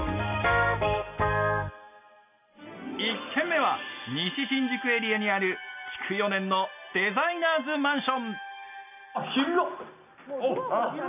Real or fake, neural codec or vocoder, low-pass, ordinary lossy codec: real; none; 3.6 kHz; none